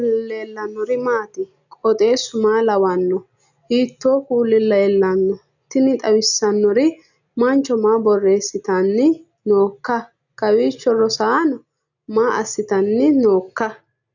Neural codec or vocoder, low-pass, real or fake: none; 7.2 kHz; real